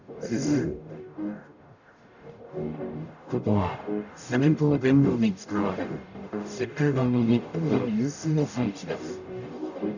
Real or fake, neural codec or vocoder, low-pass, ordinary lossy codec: fake; codec, 44.1 kHz, 0.9 kbps, DAC; 7.2 kHz; none